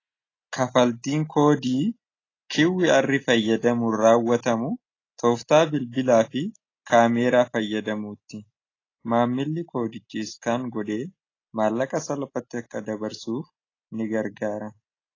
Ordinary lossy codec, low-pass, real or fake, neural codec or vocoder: AAC, 32 kbps; 7.2 kHz; real; none